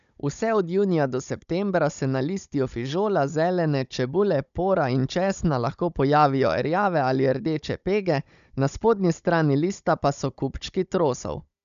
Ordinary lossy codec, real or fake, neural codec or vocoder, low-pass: none; fake; codec, 16 kHz, 16 kbps, FunCodec, trained on Chinese and English, 50 frames a second; 7.2 kHz